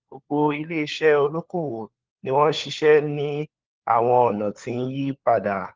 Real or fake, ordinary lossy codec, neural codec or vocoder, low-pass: fake; Opus, 16 kbps; codec, 16 kHz, 4 kbps, FunCodec, trained on LibriTTS, 50 frames a second; 7.2 kHz